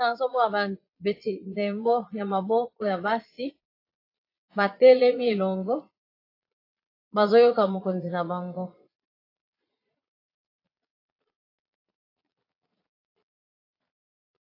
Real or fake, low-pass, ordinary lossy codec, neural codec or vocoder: fake; 5.4 kHz; AAC, 32 kbps; vocoder, 22.05 kHz, 80 mel bands, Vocos